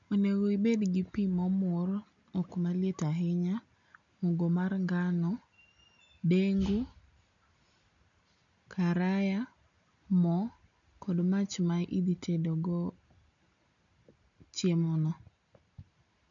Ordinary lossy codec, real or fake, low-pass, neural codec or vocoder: none; real; 7.2 kHz; none